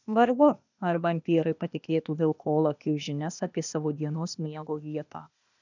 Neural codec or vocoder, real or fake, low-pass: codec, 16 kHz, 0.8 kbps, ZipCodec; fake; 7.2 kHz